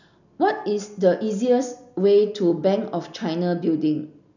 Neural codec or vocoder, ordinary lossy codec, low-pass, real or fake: none; none; 7.2 kHz; real